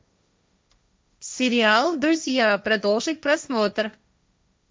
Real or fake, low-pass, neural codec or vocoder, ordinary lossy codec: fake; none; codec, 16 kHz, 1.1 kbps, Voila-Tokenizer; none